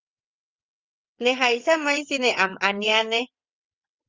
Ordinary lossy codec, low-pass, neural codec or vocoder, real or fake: Opus, 32 kbps; 7.2 kHz; vocoder, 22.05 kHz, 80 mel bands, WaveNeXt; fake